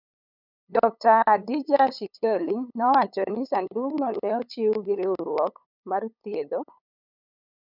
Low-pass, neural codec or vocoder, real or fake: 5.4 kHz; codec, 16 kHz, 8 kbps, FunCodec, trained on LibriTTS, 25 frames a second; fake